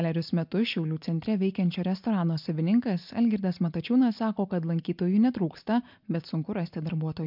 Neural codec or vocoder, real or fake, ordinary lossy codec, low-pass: none; real; MP3, 48 kbps; 5.4 kHz